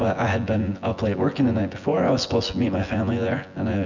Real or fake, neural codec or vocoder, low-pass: fake; vocoder, 24 kHz, 100 mel bands, Vocos; 7.2 kHz